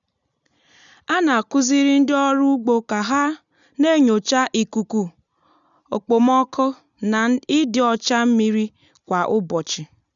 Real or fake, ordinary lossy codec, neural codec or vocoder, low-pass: real; none; none; 7.2 kHz